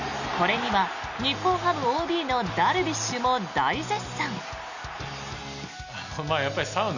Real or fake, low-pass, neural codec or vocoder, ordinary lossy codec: real; 7.2 kHz; none; none